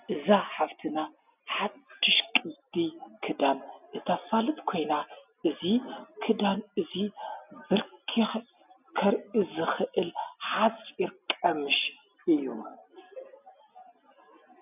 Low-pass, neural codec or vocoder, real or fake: 3.6 kHz; none; real